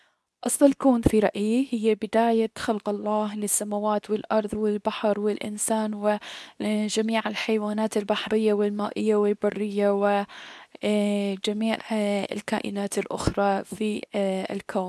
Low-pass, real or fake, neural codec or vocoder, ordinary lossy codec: none; fake; codec, 24 kHz, 0.9 kbps, WavTokenizer, medium speech release version 2; none